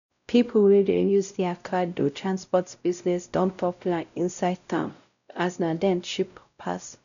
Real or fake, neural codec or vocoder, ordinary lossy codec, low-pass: fake; codec, 16 kHz, 0.5 kbps, X-Codec, WavLM features, trained on Multilingual LibriSpeech; none; 7.2 kHz